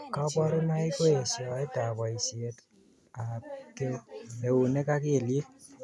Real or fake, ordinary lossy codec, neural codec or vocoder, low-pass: real; none; none; none